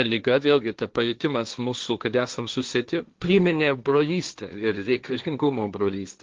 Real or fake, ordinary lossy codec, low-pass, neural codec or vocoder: fake; Opus, 32 kbps; 7.2 kHz; codec, 16 kHz, 1.1 kbps, Voila-Tokenizer